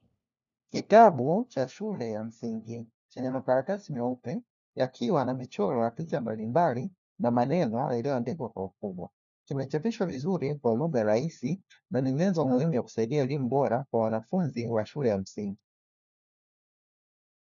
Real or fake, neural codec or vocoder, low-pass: fake; codec, 16 kHz, 1 kbps, FunCodec, trained on LibriTTS, 50 frames a second; 7.2 kHz